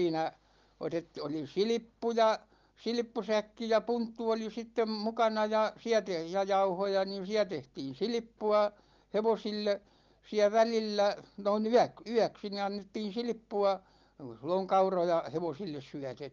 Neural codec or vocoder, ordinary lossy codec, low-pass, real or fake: none; Opus, 32 kbps; 7.2 kHz; real